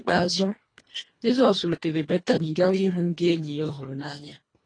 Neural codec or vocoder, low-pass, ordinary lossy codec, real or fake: codec, 24 kHz, 1.5 kbps, HILCodec; 9.9 kHz; AAC, 32 kbps; fake